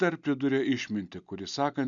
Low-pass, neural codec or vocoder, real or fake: 7.2 kHz; none; real